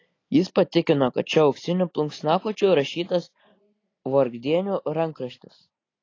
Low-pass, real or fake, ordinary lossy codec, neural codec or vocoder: 7.2 kHz; real; AAC, 32 kbps; none